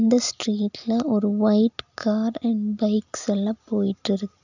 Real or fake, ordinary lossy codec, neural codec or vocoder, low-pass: real; none; none; 7.2 kHz